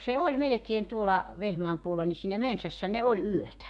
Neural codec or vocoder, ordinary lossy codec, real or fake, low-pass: codec, 32 kHz, 1.9 kbps, SNAC; none; fake; 10.8 kHz